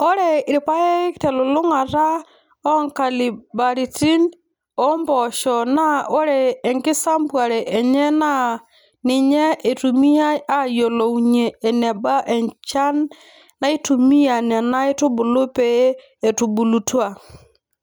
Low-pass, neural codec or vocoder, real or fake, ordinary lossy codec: none; none; real; none